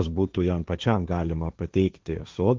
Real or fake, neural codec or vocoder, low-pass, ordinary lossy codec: fake; codec, 16 kHz, 1.1 kbps, Voila-Tokenizer; 7.2 kHz; Opus, 24 kbps